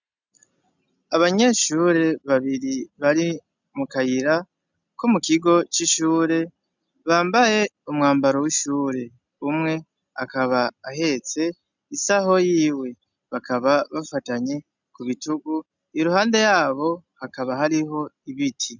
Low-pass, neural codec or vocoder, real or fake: 7.2 kHz; none; real